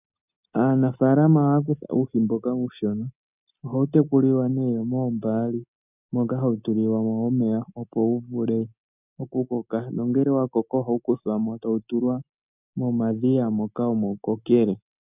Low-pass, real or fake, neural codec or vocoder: 3.6 kHz; real; none